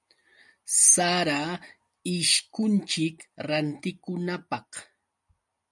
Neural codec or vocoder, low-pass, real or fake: none; 10.8 kHz; real